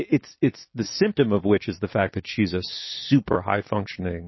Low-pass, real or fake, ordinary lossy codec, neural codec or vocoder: 7.2 kHz; real; MP3, 24 kbps; none